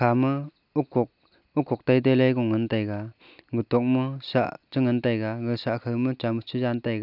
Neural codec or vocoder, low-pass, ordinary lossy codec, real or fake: none; 5.4 kHz; none; real